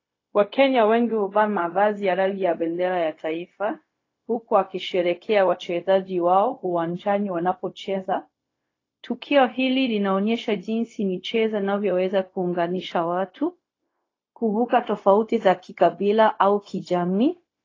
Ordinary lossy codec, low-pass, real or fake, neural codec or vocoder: AAC, 32 kbps; 7.2 kHz; fake; codec, 16 kHz, 0.4 kbps, LongCat-Audio-Codec